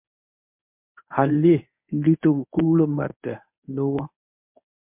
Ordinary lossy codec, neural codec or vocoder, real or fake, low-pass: MP3, 32 kbps; codec, 24 kHz, 0.9 kbps, WavTokenizer, medium speech release version 1; fake; 3.6 kHz